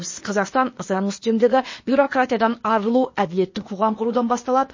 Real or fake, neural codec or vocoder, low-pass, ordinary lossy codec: fake; codec, 16 kHz, 0.8 kbps, ZipCodec; 7.2 kHz; MP3, 32 kbps